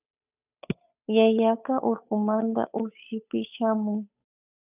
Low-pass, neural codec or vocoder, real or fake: 3.6 kHz; codec, 16 kHz, 8 kbps, FunCodec, trained on Chinese and English, 25 frames a second; fake